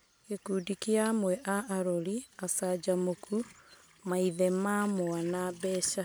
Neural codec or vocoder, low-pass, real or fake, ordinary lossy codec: none; none; real; none